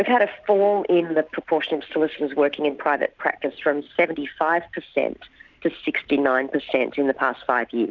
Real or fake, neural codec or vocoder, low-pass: real; none; 7.2 kHz